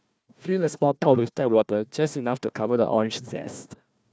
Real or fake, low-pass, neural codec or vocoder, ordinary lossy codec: fake; none; codec, 16 kHz, 1 kbps, FunCodec, trained on Chinese and English, 50 frames a second; none